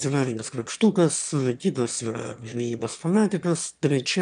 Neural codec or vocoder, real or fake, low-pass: autoencoder, 22.05 kHz, a latent of 192 numbers a frame, VITS, trained on one speaker; fake; 9.9 kHz